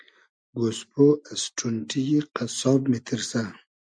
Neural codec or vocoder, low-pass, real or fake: none; 9.9 kHz; real